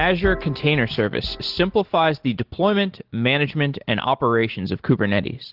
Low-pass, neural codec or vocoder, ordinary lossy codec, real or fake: 5.4 kHz; none; Opus, 16 kbps; real